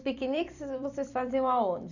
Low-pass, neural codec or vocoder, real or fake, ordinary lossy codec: 7.2 kHz; vocoder, 44.1 kHz, 128 mel bands every 256 samples, BigVGAN v2; fake; none